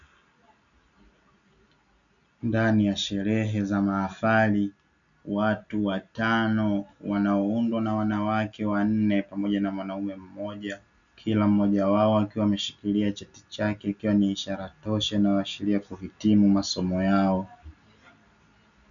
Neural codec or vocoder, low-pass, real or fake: none; 7.2 kHz; real